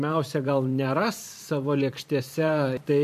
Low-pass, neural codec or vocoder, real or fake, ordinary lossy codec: 14.4 kHz; vocoder, 44.1 kHz, 128 mel bands every 512 samples, BigVGAN v2; fake; MP3, 64 kbps